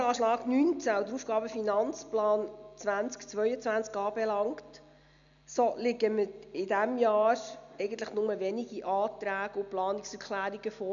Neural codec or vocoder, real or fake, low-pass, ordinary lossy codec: none; real; 7.2 kHz; none